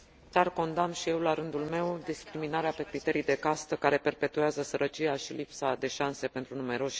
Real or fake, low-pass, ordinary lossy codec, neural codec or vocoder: real; none; none; none